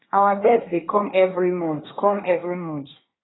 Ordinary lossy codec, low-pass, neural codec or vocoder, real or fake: AAC, 16 kbps; 7.2 kHz; codec, 24 kHz, 1 kbps, SNAC; fake